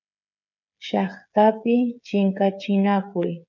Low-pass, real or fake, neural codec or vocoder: 7.2 kHz; fake; codec, 16 kHz, 8 kbps, FreqCodec, smaller model